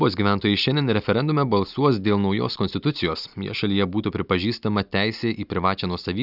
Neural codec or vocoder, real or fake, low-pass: none; real; 5.4 kHz